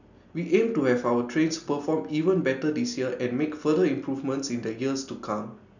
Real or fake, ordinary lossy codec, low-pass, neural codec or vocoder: real; none; 7.2 kHz; none